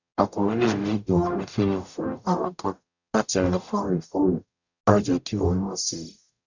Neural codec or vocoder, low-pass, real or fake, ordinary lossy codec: codec, 44.1 kHz, 0.9 kbps, DAC; 7.2 kHz; fake; none